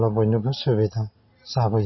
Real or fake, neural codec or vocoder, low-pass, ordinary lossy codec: real; none; 7.2 kHz; MP3, 24 kbps